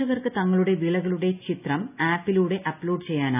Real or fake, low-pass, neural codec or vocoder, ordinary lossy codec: real; 3.6 kHz; none; none